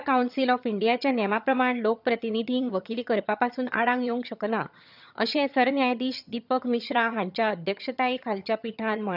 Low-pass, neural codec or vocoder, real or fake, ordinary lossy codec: 5.4 kHz; vocoder, 22.05 kHz, 80 mel bands, HiFi-GAN; fake; none